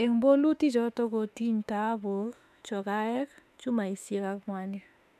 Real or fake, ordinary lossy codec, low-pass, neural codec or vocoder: fake; none; 14.4 kHz; autoencoder, 48 kHz, 32 numbers a frame, DAC-VAE, trained on Japanese speech